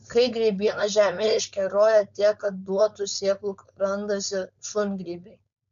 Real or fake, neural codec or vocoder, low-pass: fake; codec, 16 kHz, 4.8 kbps, FACodec; 7.2 kHz